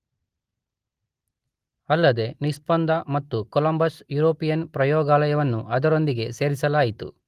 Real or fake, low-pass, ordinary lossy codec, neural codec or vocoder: real; 14.4 kHz; Opus, 24 kbps; none